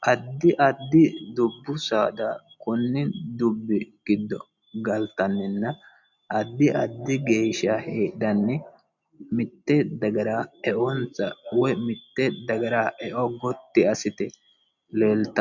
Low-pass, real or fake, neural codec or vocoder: 7.2 kHz; real; none